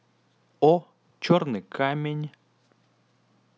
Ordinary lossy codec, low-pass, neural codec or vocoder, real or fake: none; none; none; real